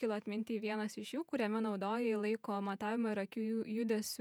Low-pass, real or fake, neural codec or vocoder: 19.8 kHz; fake; vocoder, 48 kHz, 128 mel bands, Vocos